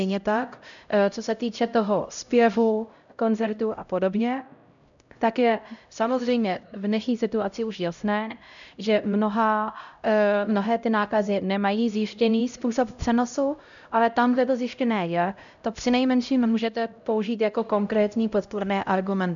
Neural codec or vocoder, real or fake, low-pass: codec, 16 kHz, 0.5 kbps, X-Codec, HuBERT features, trained on LibriSpeech; fake; 7.2 kHz